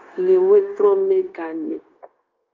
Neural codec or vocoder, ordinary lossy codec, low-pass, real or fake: codec, 16 kHz, 0.9 kbps, LongCat-Audio-Codec; Opus, 32 kbps; 7.2 kHz; fake